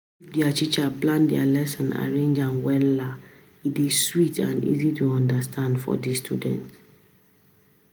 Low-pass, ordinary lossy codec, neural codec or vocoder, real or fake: none; none; none; real